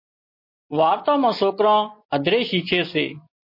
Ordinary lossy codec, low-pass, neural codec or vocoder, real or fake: MP3, 32 kbps; 5.4 kHz; none; real